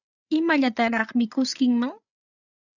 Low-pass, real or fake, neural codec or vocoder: 7.2 kHz; fake; codec, 16 kHz, 8 kbps, FreqCodec, larger model